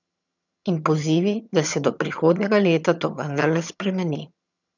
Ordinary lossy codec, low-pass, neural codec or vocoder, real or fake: none; 7.2 kHz; vocoder, 22.05 kHz, 80 mel bands, HiFi-GAN; fake